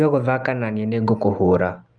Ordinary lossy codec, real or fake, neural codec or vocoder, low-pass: Opus, 32 kbps; real; none; 9.9 kHz